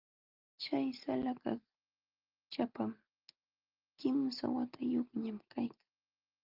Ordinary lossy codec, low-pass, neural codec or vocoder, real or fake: Opus, 32 kbps; 5.4 kHz; none; real